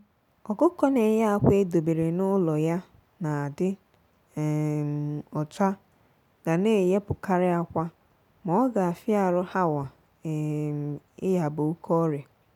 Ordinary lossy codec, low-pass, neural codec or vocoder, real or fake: none; 19.8 kHz; none; real